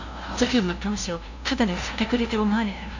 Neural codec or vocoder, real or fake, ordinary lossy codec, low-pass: codec, 16 kHz, 0.5 kbps, FunCodec, trained on LibriTTS, 25 frames a second; fake; none; 7.2 kHz